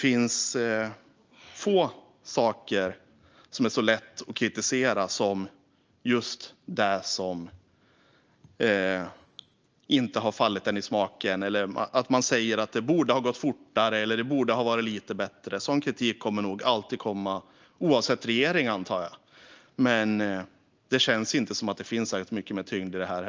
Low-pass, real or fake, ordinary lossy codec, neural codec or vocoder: 7.2 kHz; real; Opus, 24 kbps; none